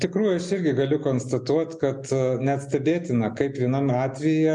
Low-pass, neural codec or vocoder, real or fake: 10.8 kHz; none; real